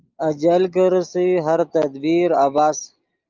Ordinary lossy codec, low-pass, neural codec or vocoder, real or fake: Opus, 24 kbps; 7.2 kHz; none; real